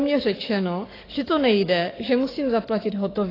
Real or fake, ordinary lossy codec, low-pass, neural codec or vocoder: fake; AAC, 24 kbps; 5.4 kHz; codec, 44.1 kHz, 7.8 kbps, DAC